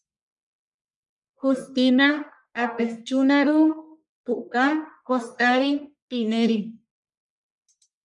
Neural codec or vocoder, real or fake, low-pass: codec, 44.1 kHz, 1.7 kbps, Pupu-Codec; fake; 10.8 kHz